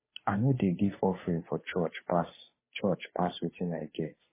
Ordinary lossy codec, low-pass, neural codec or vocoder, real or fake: MP3, 16 kbps; 3.6 kHz; codec, 16 kHz, 2 kbps, FunCodec, trained on Chinese and English, 25 frames a second; fake